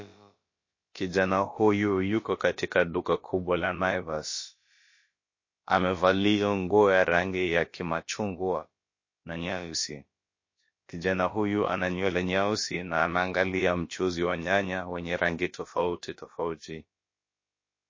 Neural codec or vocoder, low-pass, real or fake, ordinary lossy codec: codec, 16 kHz, about 1 kbps, DyCAST, with the encoder's durations; 7.2 kHz; fake; MP3, 32 kbps